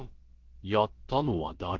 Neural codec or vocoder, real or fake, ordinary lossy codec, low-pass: codec, 16 kHz, about 1 kbps, DyCAST, with the encoder's durations; fake; Opus, 16 kbps; 7.2 kHz